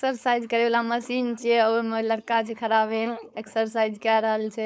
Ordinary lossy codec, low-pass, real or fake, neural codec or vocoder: none; none; fake; codec, 16 kHz, 4.8 kbps, FACodec